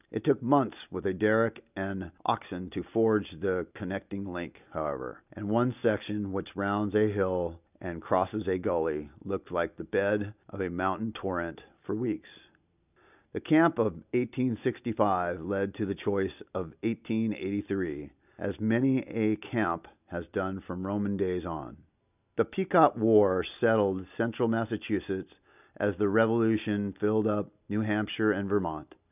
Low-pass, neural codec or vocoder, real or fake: 3.6 kHz; none; real